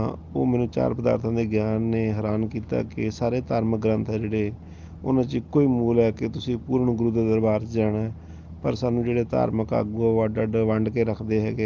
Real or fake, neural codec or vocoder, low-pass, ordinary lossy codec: real; none; 7.2 kHz; Opus, 32 kbps